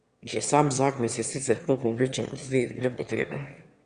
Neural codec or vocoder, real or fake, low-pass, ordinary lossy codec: autoencoder, 22.05 kHz, a latent of 192 numbers a frame, VITS, trained on one speaker; fake; 9.9 kHz; Opus, 64 kbps